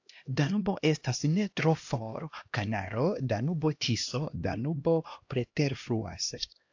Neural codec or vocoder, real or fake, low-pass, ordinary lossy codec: codec, 16 kHz, 1 kbps, X-Codec, HuBERT features, trained on LibriSpeech; fake; 7.2 kHz; AAC, 48 kbps